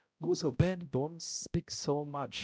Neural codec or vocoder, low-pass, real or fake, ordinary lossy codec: codec, 16 kHz, 0.5 kbps, X-Codec, HuBERT features, trained on balanced general audio; none; fake; none